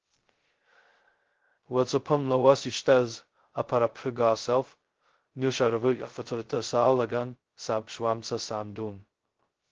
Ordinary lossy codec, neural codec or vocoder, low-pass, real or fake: Opus, 16 kbps; codec, 16 kHz, 0.2 kbps, FocalCodec; 7.2 kHz; fake